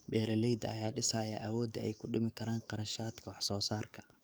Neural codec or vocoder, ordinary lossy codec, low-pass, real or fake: codec, 44.1 kHz, 7.8 kbps, DAC; none; none; fake